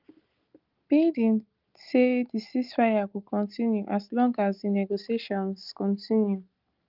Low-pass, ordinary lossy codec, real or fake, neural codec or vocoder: 5.4 kHz; Opus, 24 kbps; real; none